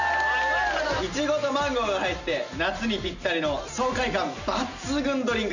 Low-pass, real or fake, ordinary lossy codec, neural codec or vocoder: 7.2 kHz; real; none; none